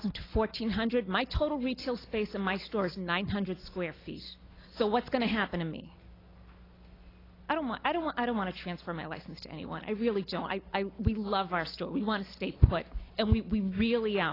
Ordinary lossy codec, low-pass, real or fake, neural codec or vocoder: AAC, 24 kbps; 5.4 kHz; real; none